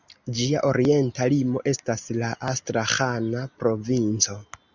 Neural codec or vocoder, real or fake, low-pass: none; real; 7.2 kHz